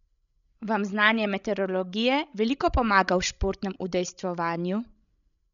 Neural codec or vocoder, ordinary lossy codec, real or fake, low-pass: codec, 16 kHz, 16 kbps, FreqCodec, larger model; none; fake; 7.2 kHz